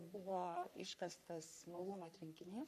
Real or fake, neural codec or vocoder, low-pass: fake; codec, 44.1 kHz, 3.4 kbps, Pupu-Codec; 14.4 kHz